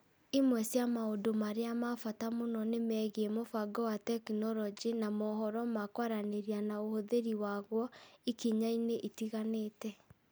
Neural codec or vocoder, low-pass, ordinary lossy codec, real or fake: none; none; none; real